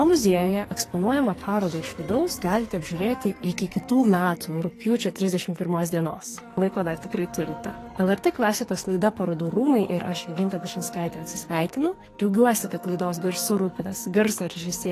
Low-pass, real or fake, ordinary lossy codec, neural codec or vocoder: 14.4 kHz; fake; AAC, 48 kbps; codec, 44.1 kHz, 2.6 kbps, SNAC